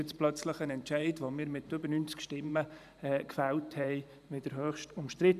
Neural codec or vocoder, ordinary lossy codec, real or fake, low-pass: vocoder, 44.1 kHz, 128 mel bands every 512 samples, BigVGAN v2; none; fake; 14.4 kHz